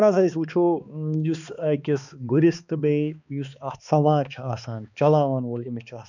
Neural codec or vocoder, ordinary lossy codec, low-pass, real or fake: codec, 16 kHz, 2 kbps, X-Codec, HuBERT features, trained on balanced general audio; none; 7.2 kHz; fake